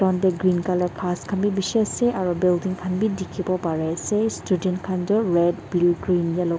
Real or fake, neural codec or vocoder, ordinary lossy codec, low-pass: real; none; none; none